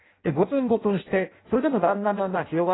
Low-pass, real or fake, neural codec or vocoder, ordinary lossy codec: 7.2 kHz; fake; codec, 16 kHz in and 24 kHz out, 0.6 kbps, FireRedTTS-2 codec; AAC, 16 kbps